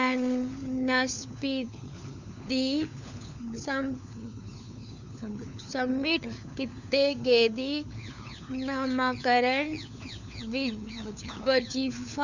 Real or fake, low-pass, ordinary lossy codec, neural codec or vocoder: fake; 7.2 kHz; none; codec, 16 kHz, 4 kbps, FunCodec, trained on LibriTTS, 50 frames a second